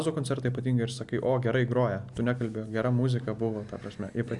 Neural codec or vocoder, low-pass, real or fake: none; 10.8 kHz; real